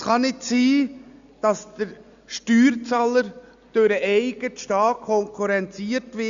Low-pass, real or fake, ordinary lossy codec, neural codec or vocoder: 7.2 kHz; real; Opus, 64 kbps; none